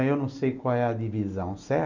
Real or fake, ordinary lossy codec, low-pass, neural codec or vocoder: real; MP3, 64 kbps; 7.2 kHz; none